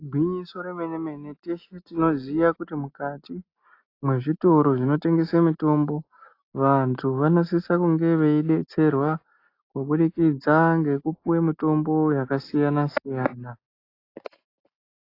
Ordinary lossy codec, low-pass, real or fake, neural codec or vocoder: AAC, 32 kbps; 5.4 kHz; real; none